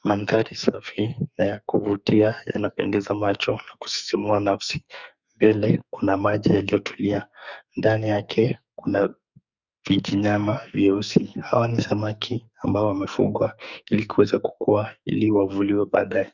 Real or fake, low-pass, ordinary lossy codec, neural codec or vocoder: fake; 7.2 kHz; Opus, 64 kbps; codec, 44.1 kHz, 2.6 kbps, SNAC